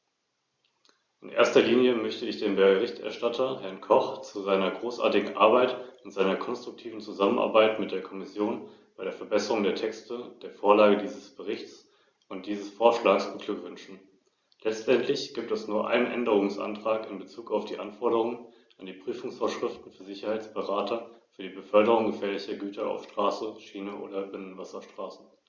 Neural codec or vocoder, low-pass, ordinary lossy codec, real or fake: none; 7.2 kHz; Opus, 64 kbps; real